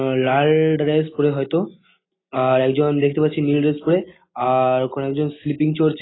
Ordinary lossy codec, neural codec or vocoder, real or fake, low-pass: AAC, 16 kbps; none; real; 7.2 kHz